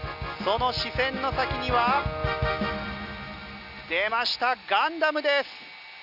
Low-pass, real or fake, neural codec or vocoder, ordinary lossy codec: 5.4 kHz; real; none; none